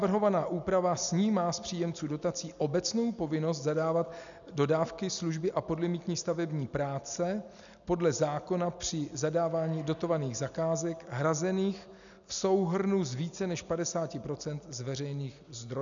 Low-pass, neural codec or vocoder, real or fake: 7.2 kHz; none; real